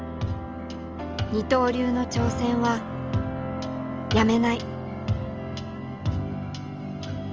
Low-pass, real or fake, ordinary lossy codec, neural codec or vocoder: 7.2 kHz; real; Opus, 24 kbps; none